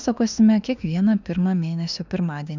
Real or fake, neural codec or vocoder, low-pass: fake; autoencoder, 48 kHz, 32 numbers a frame, DAC-VAE, trained on Japanese speech; 7.2 kHz